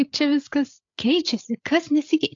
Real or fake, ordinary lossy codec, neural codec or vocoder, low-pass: fake; AAC, 48 kbps; codec, 16 kHz, 8 kbps, FreqCodec, larger model; 7.2 kHz